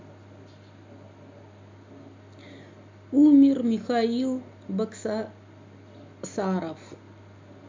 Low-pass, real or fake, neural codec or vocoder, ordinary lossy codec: 7.2 kHz; real; none; MP3, 64 kbps